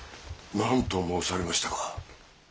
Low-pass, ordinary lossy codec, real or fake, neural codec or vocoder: none; none; real; none